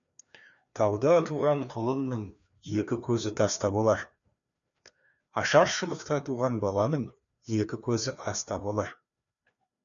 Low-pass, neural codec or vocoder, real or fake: 7.2 kHz; codec, 16 kHz, 2 kbps, FreqCodec, larger model; fake